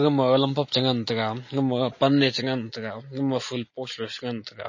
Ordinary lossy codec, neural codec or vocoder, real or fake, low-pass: MP3, 32 kbps; none; real; 7.2 kHz